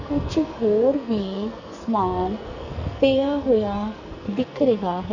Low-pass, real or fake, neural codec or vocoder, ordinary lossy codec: 7.2 kHz; fake; codec, 44.1 kHz, 2.6 kbps, SNAC; none